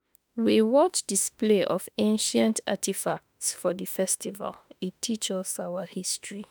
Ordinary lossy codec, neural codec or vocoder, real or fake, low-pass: none; autoencoder, 48 kHz, 32 numbers a frame, DAC-VAE, trained on Japanese speech; fake; none